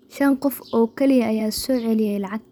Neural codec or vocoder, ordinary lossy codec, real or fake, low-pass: vocoder, 44.1 kHz, 128 mel bands every 512 samples, BigVGAN v2; none; fake; 19.8 kHz